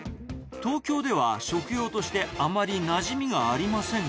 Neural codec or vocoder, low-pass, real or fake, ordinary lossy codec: none; none; real; none